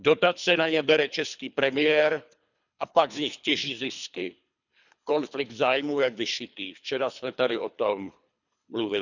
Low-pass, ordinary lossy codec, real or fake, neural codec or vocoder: 7.2 kHz; none; fake; codec, 24 kHz, 3 kbps, HILCodec